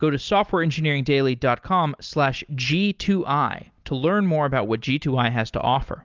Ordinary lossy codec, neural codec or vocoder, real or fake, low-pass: Opus, 32 kbps; none; real; 7.2 kHz